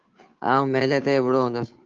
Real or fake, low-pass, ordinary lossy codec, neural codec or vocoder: fake; 7.2 kHz; Opus, 24 kbps; codec, 16 kHz, 2 kbps, FunCodec, trained on Chinese and English, 25 frames a second